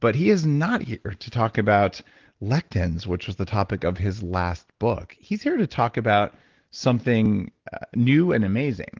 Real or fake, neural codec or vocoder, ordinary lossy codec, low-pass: real; none; Opus, 16 kbps; 7.2 kHz